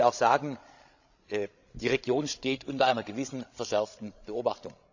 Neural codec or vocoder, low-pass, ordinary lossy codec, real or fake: codec, 16 kHz, 16 kbps, FreqCodec, larger model; 7.2 kHz; none; fake